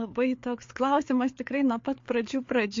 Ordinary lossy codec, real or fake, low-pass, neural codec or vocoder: MP3, 48 kbps; fake; 7.2 kHz; codec, 16 kHz, 4 kbps, FreqCodec, larger model